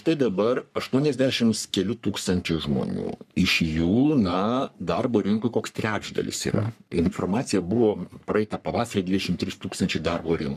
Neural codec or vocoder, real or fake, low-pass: codec, 44.1 kHz, 3.4 kbps, Pupu-Codec; fake; 14.4 kHz